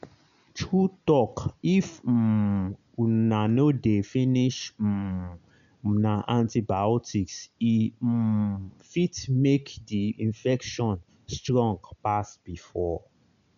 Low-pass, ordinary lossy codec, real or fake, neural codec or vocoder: 7.2 kHz; MP3, 96 kbps; real; none